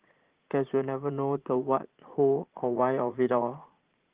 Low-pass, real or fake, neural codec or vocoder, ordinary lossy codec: 3.6 kHz; fake; vocoder, 44.1 kHz, 80 mel bands, Vocos; Opus, 16 kbps